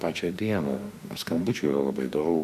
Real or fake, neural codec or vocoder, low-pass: fake; autoencoder, 48 kHz, 32 numbers a frame, DAC-VAE, trained on Japanese speech; 14.4 kHz